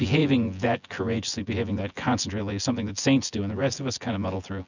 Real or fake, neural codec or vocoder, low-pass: fake; vocoder, 24 kHz, 100 mel bands, Vocos; 7.2 kHz